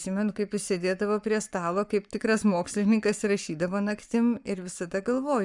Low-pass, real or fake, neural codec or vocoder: 10.8 kHz; fake; codec, 44.1 kHz, 7.8 kbps, Pupu-Codec